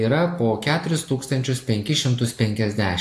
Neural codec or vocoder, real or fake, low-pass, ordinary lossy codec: none; real; 14.4 kHz; AAC, 64 kbps